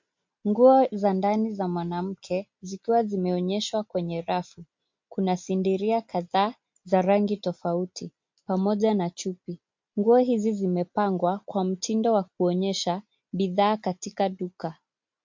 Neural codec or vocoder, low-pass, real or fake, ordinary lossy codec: none; 7.2 kHz; real; MP3, 48 kbps